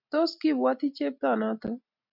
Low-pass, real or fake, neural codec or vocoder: 5.4 kHz; real; none